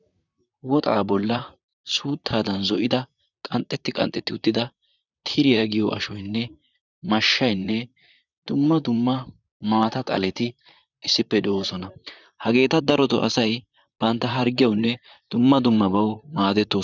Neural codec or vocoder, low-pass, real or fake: vocoder, 44.1 kHz, 128 mel bands, Pupu-Vocoder; 7.2 kHz; fake